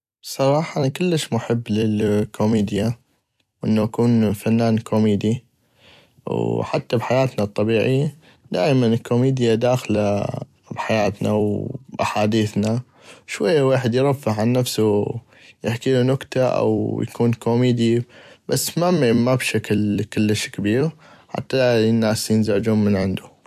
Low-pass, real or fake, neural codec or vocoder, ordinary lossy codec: 14.4 kHz; fake; vocoder, 44.1 kHz, 128 mel bands every 256 samples, BigVGAN v2; none